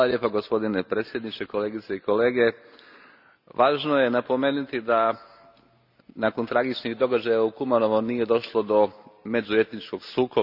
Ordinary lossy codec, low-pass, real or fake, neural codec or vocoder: none; 5.4 kHz; real; none